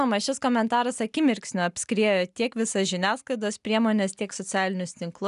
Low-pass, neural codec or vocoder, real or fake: 10.8 kHz; none; real